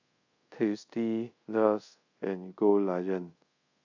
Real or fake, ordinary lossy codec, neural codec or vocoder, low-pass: fake; AAC, 48 kbps; codec, 24 kHz, 0.5 kbps, DualCodec; 7.2 kHz